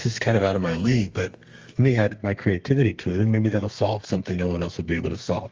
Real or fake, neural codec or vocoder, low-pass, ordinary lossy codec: fake; codec, 32 kHz, 1.9 kbps, SNAC; 7.2 kHz; Opus, 32 kbps